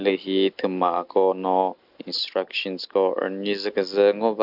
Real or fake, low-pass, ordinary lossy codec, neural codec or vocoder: real; 5.4 kHz; none; none